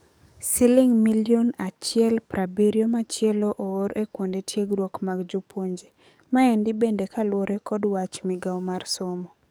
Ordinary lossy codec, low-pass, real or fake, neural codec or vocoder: none; none; fake; codec, 44.1 kHz, 7.8 kbps, DAC